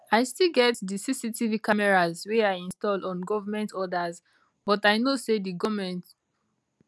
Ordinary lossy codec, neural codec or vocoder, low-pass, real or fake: none; none; none; real